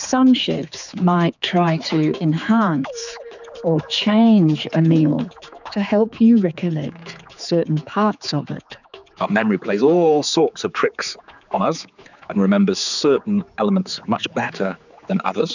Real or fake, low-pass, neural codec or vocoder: fake; 7.2 kHz; codec, 16 kHz, 4 kbps, X-Codec, HuBERT features, trained on general audio